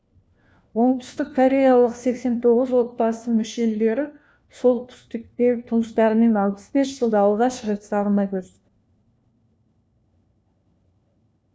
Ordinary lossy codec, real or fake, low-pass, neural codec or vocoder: none; fake; none; codec, 16 kHz, 1 kbps, FunCodec, trained on LibriTTS, 50 frames a second